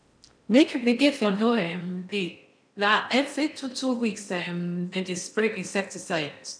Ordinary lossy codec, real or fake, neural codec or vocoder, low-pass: none; fake; codec, 16 kHz in and 24 kHz out, 0.6 kbps, FocalCodec, streaming, 4096 codes; 9.9 kHz